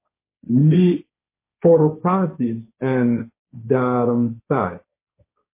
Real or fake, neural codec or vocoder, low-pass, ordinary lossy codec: fake; codec, 16 kHz, 1.1 kbps, Voila-Tokenizer; 3.6 kHz; MP3, 24 kbps